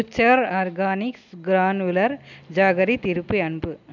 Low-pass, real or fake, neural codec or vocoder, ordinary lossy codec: 7.2 kHz; real; none; none